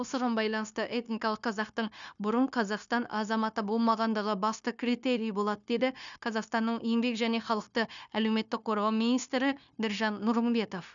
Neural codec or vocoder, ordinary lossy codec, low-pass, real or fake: codec, 16 kHz, 0.9 kbps, LongCat-Audio-Codec; none; 7.2 kHz; fake